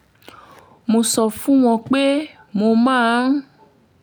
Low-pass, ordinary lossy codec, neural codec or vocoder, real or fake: none; none; none; real